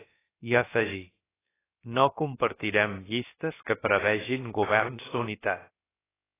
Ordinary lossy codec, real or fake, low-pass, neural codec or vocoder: AAC, 16 kbps; fake; 3.6 kHz; codec, 16 kHz, about 1 kbps, DyCAST, with the encoder's durations